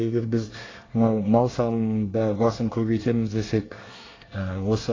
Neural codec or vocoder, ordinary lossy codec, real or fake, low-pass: codec, 24 kHz, 1 kbps, SNAC; AAC, 32 kbps; fake; 7.2 kHz